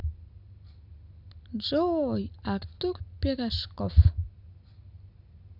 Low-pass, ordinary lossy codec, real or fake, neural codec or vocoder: 5.4 kHz; none; real; none